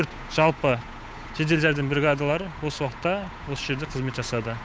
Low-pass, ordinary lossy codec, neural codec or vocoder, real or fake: none; none; codec, 16 kHz, 8 kbps, FunCodec, trained on Chinese and English, 25 frames a second; fake